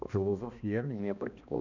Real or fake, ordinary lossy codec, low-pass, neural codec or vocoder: fake; none; 7.2 kHz; codec, 16 kHz, 1 kbps, X-Codec, HuBERT features, trained on balanced general audio